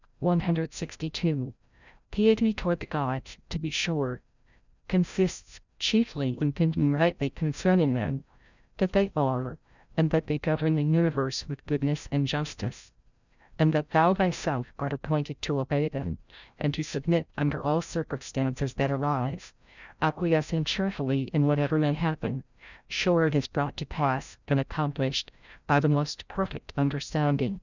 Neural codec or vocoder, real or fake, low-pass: codec, 16 kHz, 0.5 kbps, FreqCodec, larger model; fake; 7.2 kHz